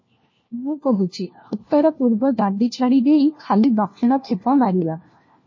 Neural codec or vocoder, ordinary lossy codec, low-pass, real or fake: codec, 16 kHz, 1 kbps, FunCodec, trained on LibriTTS, 50 frames a second; MP3, 32 kbps; 7.2 kHz; fake